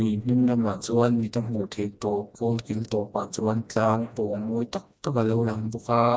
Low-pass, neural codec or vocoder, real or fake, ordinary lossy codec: none; codec, 16 kHz, 1 kbps, FreqCodec, smaller model; fake; none